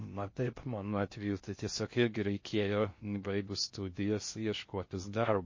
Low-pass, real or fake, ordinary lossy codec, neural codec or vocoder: 7.2 kHz; fake; MP3, 32 kbps; codec, 16 kHz in and 24 kHz out, 0.6 kbps, FocalCodec, streaming, 4096 codes